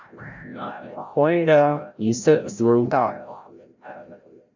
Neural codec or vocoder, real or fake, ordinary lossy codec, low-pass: codec, 16 kHz, 0.5 kbps, FreqCodec, larger model; fake; MP3, 64 kbps; 7.2 kHz